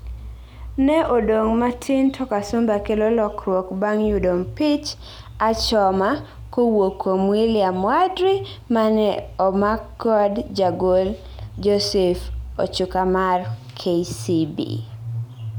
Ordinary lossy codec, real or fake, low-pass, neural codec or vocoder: none; real; none; none